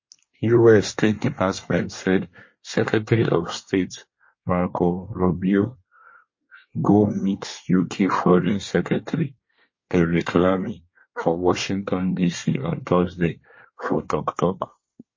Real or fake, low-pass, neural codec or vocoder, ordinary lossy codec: fake; 7.2 kHz; codec, 24 kHz, 1 kbps, SNAC; MP3, 32 kbps